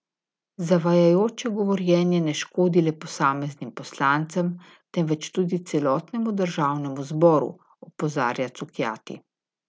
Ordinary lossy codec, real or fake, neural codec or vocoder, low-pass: none; real; none; none